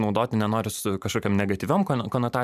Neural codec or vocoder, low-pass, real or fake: vocoder, 44.1 kHz, 128 mel bands every 512 samples, BigVGAN v2; 14.4 kHz; fake